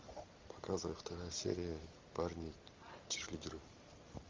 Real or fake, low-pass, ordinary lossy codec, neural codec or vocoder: real; 7.2 kHz; Opus, 32 kbps; none